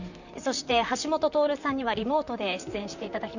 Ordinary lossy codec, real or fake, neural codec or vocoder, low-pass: none; fake; vocoder, 44.1 kHz, 128 mel bands, Pupu-Vocoder; 7.2 kHz